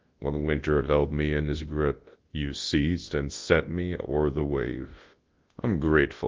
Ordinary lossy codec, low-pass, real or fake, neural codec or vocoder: Opus, 16 kbps; 7.2 kHz; fake; codec, 24 kHz, 0.9 kbps, WavTokenizer, large speech release